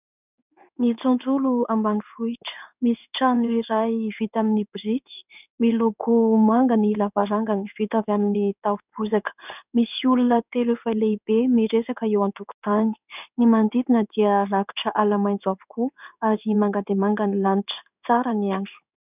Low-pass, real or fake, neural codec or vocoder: 3.6 kHz; fake; codec, 16 kHz in and 24 kHz out, 1 kbps, XY-Tokenizer